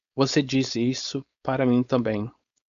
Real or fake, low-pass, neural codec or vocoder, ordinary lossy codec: fake; 7.2 kHz; codec, 16 kHz, 4.8 kbps, FACodec; AAC, 96 kbps